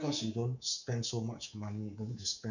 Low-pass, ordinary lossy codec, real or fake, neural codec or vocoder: 7.2 kHz; none; fake; codec, 24 kHz, 3.1 kbps, DualCodec